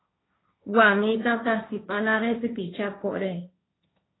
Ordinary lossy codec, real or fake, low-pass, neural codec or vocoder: AAC, 16 kbps; fake; 7.2 kHz; codec, 16 kHz, 1.1 kbps, Voila-Tokenizer